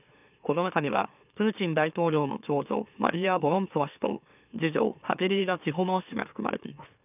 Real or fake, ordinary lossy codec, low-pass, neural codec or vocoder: fake; none; 3.6 kHz; autoencoder, 44.1 kHz, a latent of 192 numbers a frame, MeloTTS